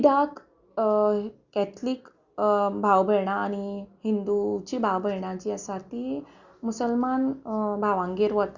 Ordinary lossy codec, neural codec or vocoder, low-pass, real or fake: Opus, 64 kbps; none; 7.2 kHz; real